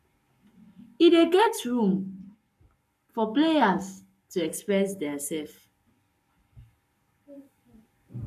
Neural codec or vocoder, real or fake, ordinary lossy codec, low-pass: codec, 44.1 kHz, 7.8 kbps, Pupu-Codec; fake; none; 14.4 kHz